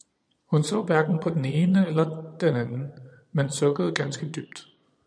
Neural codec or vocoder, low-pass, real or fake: vocoder, 22.05 kHz, 80 mel bands, Vocos; 9.9 kHz; fake